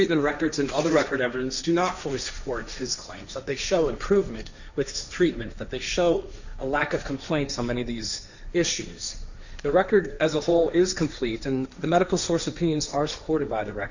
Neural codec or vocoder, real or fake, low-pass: codec, 16 kHz, 1.1 kbps, Voila-Tokenizer; fake; 7.2 kHz